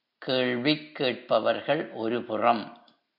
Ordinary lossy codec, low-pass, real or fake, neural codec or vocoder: MP3, 48 kbps; 5.4 kHz; real; none